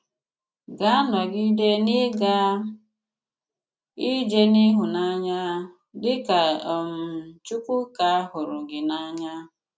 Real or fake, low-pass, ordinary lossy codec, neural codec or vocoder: real; none; none; none